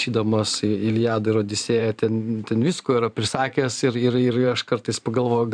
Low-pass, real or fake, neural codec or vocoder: 9.9 kHz; fake; vocoder, 44.1 kHz, 128 mel bands every 512 samples, BigVGAN v2